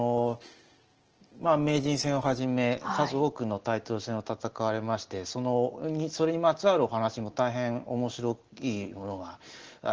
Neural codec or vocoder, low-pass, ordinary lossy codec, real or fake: none; 7.2 kHz; Opus, 16 kbps; real